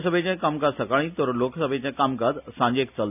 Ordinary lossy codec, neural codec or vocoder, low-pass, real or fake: none; none; 3.6 kHz; real